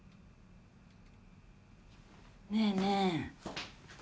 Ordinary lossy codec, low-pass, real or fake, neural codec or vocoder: none; none; real; none